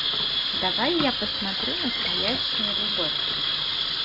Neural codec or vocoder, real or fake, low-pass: none; real; 5.4 kHz